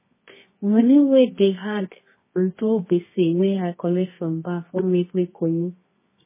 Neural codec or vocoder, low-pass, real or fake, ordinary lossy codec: codec, 24 kHz, 0.9 kbps, WavTokenizer, medium music audio release; 3.6 kHz; fake; MP3, 16 kbps